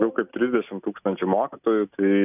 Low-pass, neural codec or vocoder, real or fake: 3.6 kHz; none; real